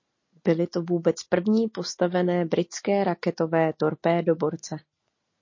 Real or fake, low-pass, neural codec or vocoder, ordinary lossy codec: real; 7.2 kHz; none; MP3, 32 kbps